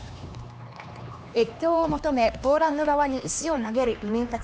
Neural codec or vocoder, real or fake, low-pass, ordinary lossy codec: codec, 16 kHz, 2 kbps, X-Codec, HuBERT features, trained on LibriSpeech; fake; none; none